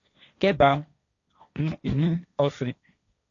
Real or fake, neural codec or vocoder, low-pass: fake; codec, 16 kHz, 1.1 kbps, Voila-Tokenizer; 7.2 kHz